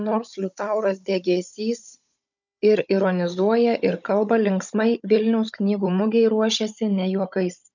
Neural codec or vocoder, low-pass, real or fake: codec, 16 kHz, 16 kbps, FunCodec, trained on Chinese and English, 50 frames a second; 7.2 kHz; fake